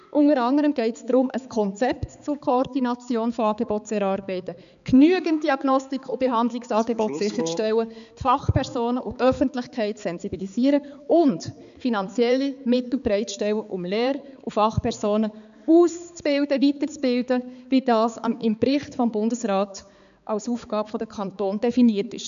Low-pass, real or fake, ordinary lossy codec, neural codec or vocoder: 7.2 kHz; fake; none; codec, 16 kHz, 4 kbps, X-Codec, HuBERT features, trained on balanced general audio